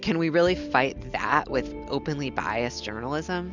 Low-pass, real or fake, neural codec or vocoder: 7.2 kHz; real; none